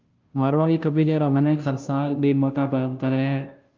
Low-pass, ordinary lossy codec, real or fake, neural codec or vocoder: 7.2 kHz; Opus, 24 kbps; fake; codec, 16 kHz, 0.5 kbps, FunCodec, trained on Chinese and English, 25 frames a second